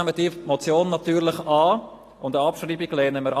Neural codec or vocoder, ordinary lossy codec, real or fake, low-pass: none; AAC, 48 kbps; real; 14.4 kHz